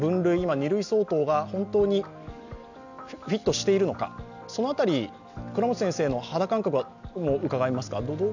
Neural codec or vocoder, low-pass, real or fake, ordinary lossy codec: none; 7.2 kHz; real; none